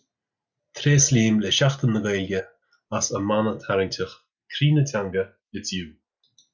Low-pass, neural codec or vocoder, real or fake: 7.2 kHz; none; real